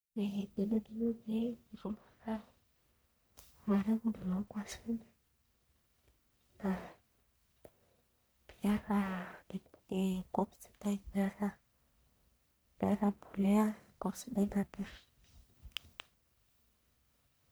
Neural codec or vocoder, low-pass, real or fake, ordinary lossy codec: codec, 44.1 kHz, 1.7 kbps, Pupu-Codec; none; fake; none